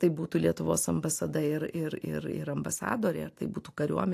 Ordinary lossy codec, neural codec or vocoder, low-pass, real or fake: AAC, 64 kbps; none; 14.4 kHz; real